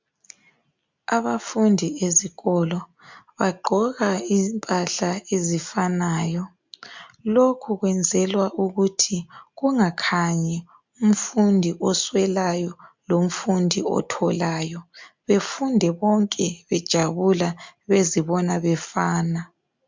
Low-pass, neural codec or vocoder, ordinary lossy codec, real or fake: 7.2 kHz; none; MP3, 64 kbps; real